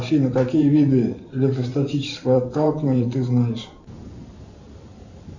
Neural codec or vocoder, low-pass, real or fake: vocoder, 44.1 kHz, 128 mel bands every 512 samples, BigVGAN v2; 7.2 kHz; fake